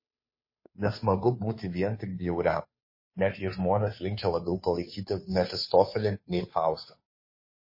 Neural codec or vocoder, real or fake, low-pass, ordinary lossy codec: codec, 16 kHz, 2 kbps, FunCodec, trained on Chinese and English, 25 frames a second; fake; 5.4 kHz; MP3, 24 kbps